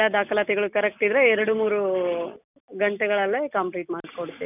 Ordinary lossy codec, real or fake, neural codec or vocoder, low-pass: none; real; none; 3.6 kHz